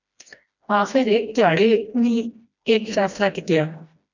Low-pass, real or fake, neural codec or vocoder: 7.2 kHz; fake; codec, 16 kHz, 1 kbps, FreqCodec, smaller model